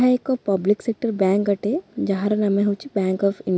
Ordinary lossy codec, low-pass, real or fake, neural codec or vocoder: none; none; real; none